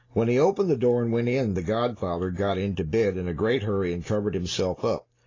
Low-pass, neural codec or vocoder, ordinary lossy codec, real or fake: 7.2 kHz; none; AAC, 32 kbps; real